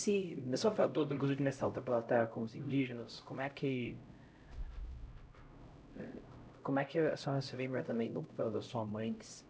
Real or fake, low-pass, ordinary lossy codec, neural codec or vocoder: fake; none; none; codec, 16 kHz, 0.5 kbps, X-Codec, HuBERT features, trained on LibriSpeech